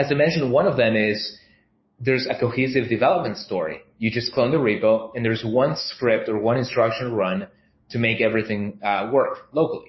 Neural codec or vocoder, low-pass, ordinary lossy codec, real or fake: codec, 16 kHz, 6 kbps, DAC; 7.2 kHz; MP3, 24 kbps; fake